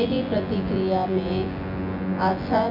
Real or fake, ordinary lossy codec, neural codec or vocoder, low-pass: fake; none; vocoder, 24 kHz, 100 mel bands, Vocos; 5.4 kHz